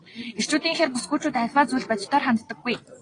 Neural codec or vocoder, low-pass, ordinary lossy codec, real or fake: none; 9.9 kHz; AAC, 32 kbps; real